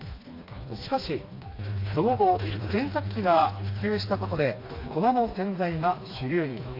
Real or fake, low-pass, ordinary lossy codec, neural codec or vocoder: fake; 5.4 kHz; AAC, 32 kbps; codec, 16 kHz, 2 kbps, FreqCodec, smaller model